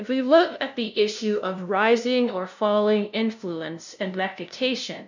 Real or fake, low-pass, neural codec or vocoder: fake; 7.2 kHz; codec, 16 kHz, 0.5 kbps, FunCodec, trained on LibriTTS, 25 frames a second